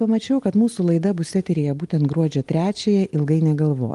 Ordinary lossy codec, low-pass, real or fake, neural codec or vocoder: Opus, 24 kbps; 10.8 kHz; real; none